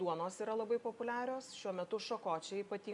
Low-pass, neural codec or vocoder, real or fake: 10.8 kHz; none; real